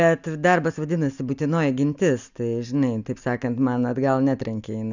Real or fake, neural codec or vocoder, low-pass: real; none; 7.2 kHz